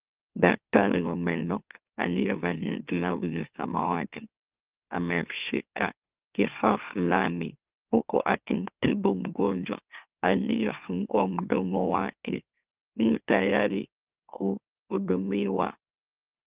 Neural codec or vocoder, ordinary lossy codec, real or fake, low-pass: autoencoder, 44.1 kHz, a latent of 192 numbers a frame, MeloTTS; Opus, 32 kbps; fake; 3.6 kHz